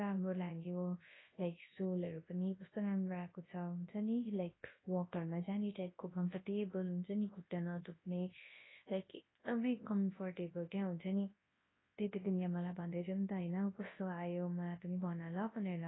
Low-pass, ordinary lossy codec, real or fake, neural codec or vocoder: 7.2 kHz; AAC, 16 kbps; fake; codec, 24 kHz, 0.9 kbps, WavTokenizer, large speech release